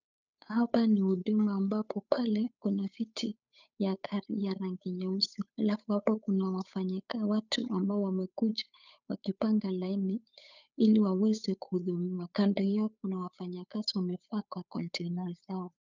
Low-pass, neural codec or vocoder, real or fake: 7.2 kHz; codec, 16 kHz, 8 kbps, FunCodec, trained on Chinese and English, 25 frames a second; fake